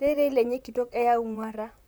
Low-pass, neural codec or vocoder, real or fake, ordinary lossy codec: none; vocoder, 44.1 kHz, 128 mel bands, Pupu-Vocoder; fake; none